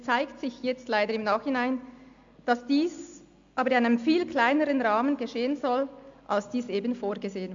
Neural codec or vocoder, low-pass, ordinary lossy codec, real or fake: none; 7.2 kHz; AAC, 64 kbps; real